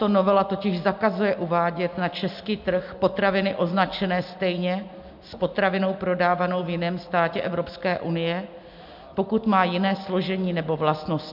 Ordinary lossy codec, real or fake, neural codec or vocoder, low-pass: MP3, 48 kbps; real; none; 5.4 kHz